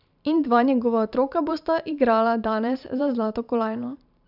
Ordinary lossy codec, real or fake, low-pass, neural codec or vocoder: none; fake; 5.4 kHz; vocoder, 44.1 kHz, 128 mel bands every 512 samples, BigVGAN v2